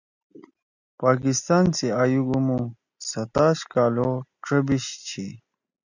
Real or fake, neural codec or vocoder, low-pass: real; none; 7.2 kHz